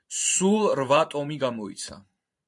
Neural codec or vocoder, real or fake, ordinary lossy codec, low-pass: vocoder, 44.1 kHz, 128 mel bands every 512 samples, BigVGAN v2; fake; AAC, 64 kbps; 10.8 kHz